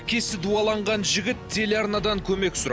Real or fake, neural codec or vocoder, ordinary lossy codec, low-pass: real; none; none; none